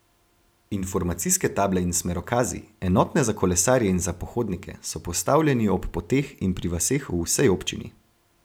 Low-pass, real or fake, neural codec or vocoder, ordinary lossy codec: none; real; none; none